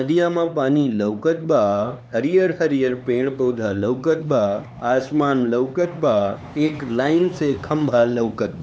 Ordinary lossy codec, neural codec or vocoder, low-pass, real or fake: none; codec, 16 kHz, 4 kbps, X-Codec, HuBERT features, trained on LibriSpeech; none; fake